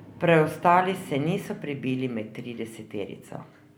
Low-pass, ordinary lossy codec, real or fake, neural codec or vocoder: none; none; real; none